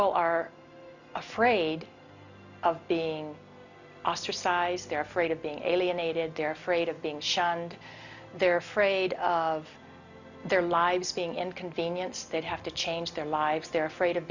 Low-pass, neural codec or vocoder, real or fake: 7.2 kHz; none; real